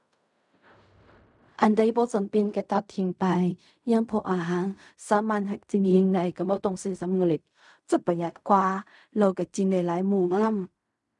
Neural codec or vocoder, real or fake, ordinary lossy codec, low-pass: codec, 16 kHz in and 24 kHz out, 0.4 kbps, LongCat-Audio-Codec, fine tuned four codebook decoder; fake; none; 10.8 kHz